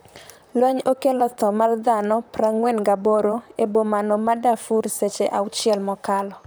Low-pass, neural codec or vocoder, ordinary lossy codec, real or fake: none; vocoder, 44.1 kHz, 128 mel bands, Pupu-Vocoder; none; fake